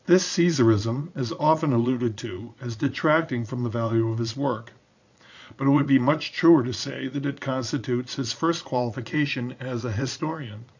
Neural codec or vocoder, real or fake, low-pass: vocoder, 22.05 kHz, 80 mel bands, Vocos; fake; 7.2 kHz